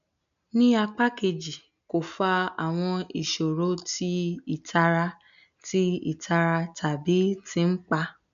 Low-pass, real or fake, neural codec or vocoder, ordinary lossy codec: 7.2 kHz; real; none; none